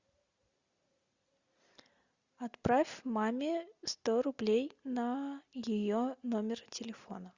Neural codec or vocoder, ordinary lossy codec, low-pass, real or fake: none; Opus, 64 kbps; 7.2 kHz; real